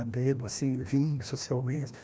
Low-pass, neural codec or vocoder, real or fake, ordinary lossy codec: none; codec, 16 kHz, 1 kbps, FreqCodec, larger model; fake; none